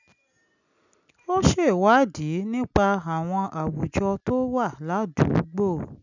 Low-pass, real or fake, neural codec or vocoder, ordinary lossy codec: 7.2 kHz; real; none; none